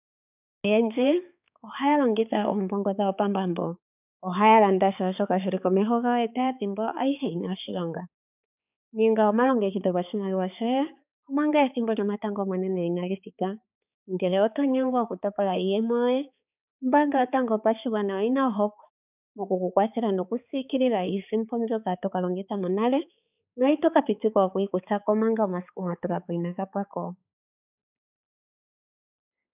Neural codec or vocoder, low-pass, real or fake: codec, 16 kHz, 4 kbps, X-Codec, HuBERT features, trained on balanced general audio; 3.6 kHz; fake